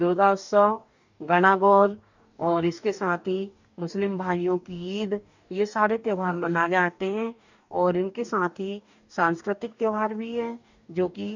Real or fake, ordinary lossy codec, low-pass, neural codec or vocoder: fake; none; 7.2 kHz; codec, 44.1 kHz, 2.6 kbps, DAC